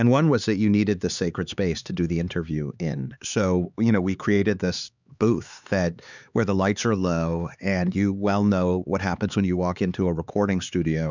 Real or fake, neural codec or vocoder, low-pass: fake; codec, 16 kHz, 4 kbps, X-Codec, HuBERT features, trained on LibriSpeech; 7.2 kHz